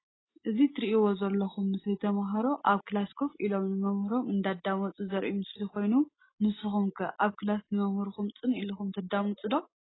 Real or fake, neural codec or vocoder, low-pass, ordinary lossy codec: real; none; 7.2 kHz; AAC, 16 kbps